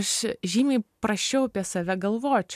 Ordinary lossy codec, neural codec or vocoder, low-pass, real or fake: MP3, 96 kbps; none; 14.4 kHz; real